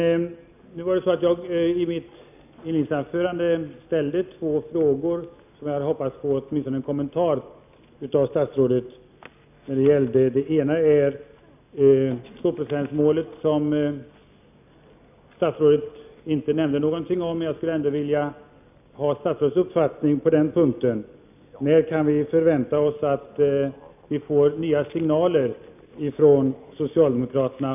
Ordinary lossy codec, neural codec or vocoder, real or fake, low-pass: none; none; real; 3.6 kHz